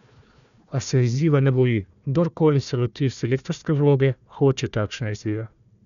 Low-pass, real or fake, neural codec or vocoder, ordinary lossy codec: 7.2 kHz; fake; codec, 16 kHz, 1 kbps, FunCodec, trained on Chinese and English, 50 frames a second; none